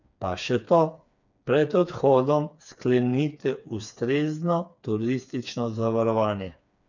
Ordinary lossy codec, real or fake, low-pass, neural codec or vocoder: none; fake; 7.2 kHz; codec, 16 kHz, 4 kbps, FreqCodec, smaller model